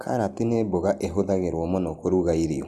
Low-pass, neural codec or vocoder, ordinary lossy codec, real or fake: 14.4 kHz; none; Opus, 24 kbps; real